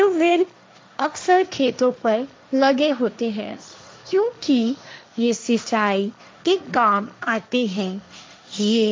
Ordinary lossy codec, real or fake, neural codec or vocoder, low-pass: none; fake; codec, 16 kHz, 1.1 kbps, Voila-Tokenizer; none